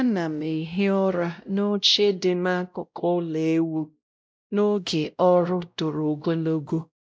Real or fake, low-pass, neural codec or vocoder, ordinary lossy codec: fake; none; codec, 16 kHz, 0.5 kbps, X-Codec, WavLM features, trained on Multilingual LibriSpeech; none